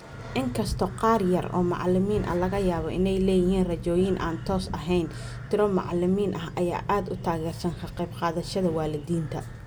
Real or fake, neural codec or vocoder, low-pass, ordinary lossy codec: real; none; none; none